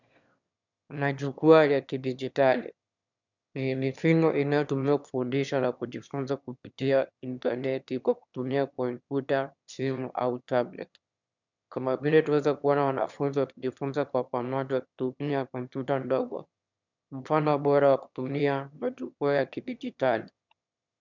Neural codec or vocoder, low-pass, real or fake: autoencoder, 22.05 kHz, a latent of 192 numbers a frame, VITS, trained on one speaker; 7.2 kHz; fake